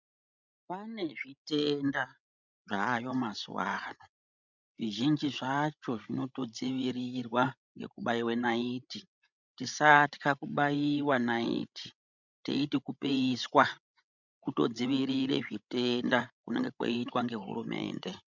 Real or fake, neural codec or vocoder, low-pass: fake; codec, 16 kHz, 16 kbps, FreqCodec, larger model; 7.2 kHz